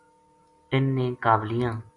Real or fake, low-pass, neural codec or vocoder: real; 10.8 kHz; none